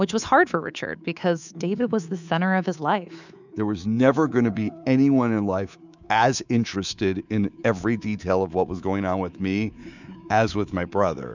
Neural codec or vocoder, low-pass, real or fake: codec, 24 kHz, 3.1 kbps, DualCodec; 7.2 kHz; fake